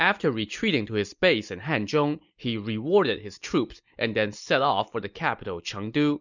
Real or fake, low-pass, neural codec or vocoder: real; 7.2 kHz; none